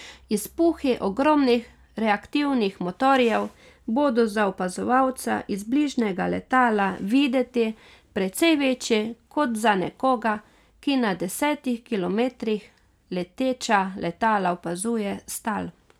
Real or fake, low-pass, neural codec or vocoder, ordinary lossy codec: real; 19.8 kHz; none; none